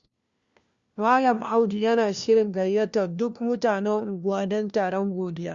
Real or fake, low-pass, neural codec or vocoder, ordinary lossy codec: fake; 7.2 kHz; codec, 16 kHz, 1 kbps, FunCodec, trained on LibriTTS, 50 frames a second; Opus, 64 kbps